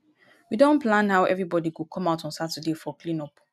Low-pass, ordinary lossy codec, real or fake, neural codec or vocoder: 14.4 kHz; none; real; none